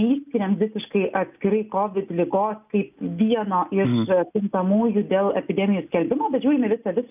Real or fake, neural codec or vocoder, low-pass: real; none; 3.6 kHz